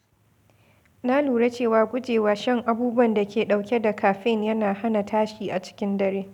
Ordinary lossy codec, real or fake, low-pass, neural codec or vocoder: none; real; 19.8 kHz; none